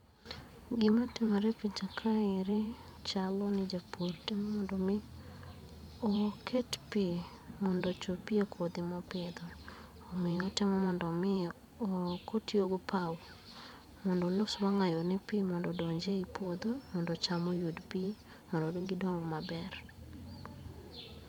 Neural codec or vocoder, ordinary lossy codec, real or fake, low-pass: vocoder, 44.1 kHz, 128 mel bands every 512 samples, BigVGAN v2; none; fake; 19.8 kHz